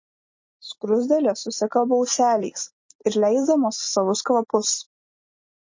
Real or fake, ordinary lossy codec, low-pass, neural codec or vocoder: real; MP3, 32 kbps; 7.2 kHz; none